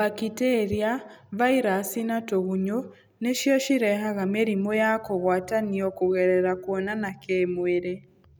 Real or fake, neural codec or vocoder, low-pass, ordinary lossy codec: real; none; none; none